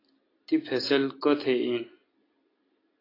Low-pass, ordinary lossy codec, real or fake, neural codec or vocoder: 5.4 kHz; AAC, 24 kbps; real; none